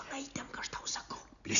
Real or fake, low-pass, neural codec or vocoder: real; 7.2 kHz; none